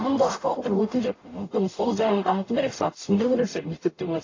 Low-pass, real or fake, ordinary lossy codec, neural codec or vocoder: 7.2 kHz; fake; AAC, 32 kbps; codec, 44.1 kHz, 0.9 kbps, DAC